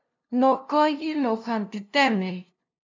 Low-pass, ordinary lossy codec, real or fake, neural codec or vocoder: 7.2 kHz; MP3, 64 kbps; fake; codec, 16 kHz, 0.5 kbps, FunCodec, trained on LibriTTS, 25 frames a second